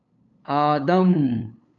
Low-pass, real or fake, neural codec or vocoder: 7.2 kHz; fake; codec, 16 kHz, 8 kbps, FunCodec, trained on LibriTTS, 25 frames a second